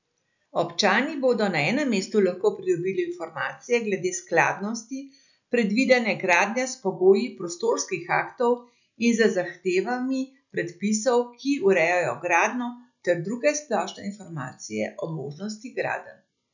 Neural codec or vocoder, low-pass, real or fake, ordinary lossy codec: none; 7.2 kHz; real; none